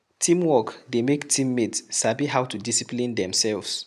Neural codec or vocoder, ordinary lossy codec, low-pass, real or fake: none; none; 14.4 kHz; real